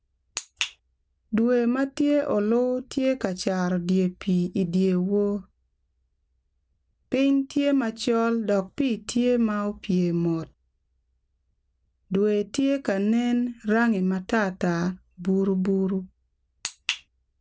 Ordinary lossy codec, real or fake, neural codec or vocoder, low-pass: none; real; none; none